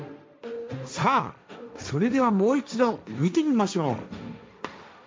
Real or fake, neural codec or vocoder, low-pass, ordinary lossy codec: fake; codec, 16 kHz, 1.1 kbps, Voila-Tokenizer; none; none